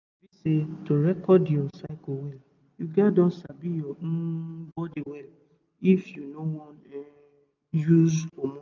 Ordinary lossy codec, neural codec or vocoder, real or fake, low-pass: none; none; real; 7.2 kHz